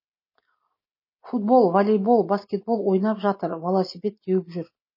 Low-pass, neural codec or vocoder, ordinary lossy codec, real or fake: 5.4 kHz; none; MP3, 24 kbps; real